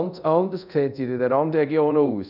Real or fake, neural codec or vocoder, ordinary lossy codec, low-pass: fake; codec, 24 kHz, 0.5 kbps, DualCodec; none; 5.4 kHz